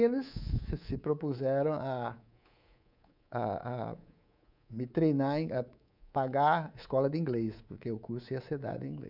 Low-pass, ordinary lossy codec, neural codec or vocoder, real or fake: 5.4 kHz; none; codec, 24 kHz, 3.1 kbps, DualCodec; fake